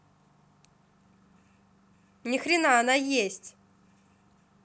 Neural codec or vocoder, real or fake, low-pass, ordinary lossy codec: none; real; none; none